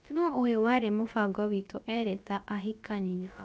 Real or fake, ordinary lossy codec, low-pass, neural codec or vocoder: fake; none; none; codec, 16 kHz, about 1 kbps, DyCAST, with the encoder's durations